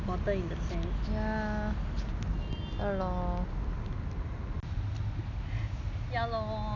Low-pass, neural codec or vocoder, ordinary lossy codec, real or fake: 7.2 kHz; none; none; real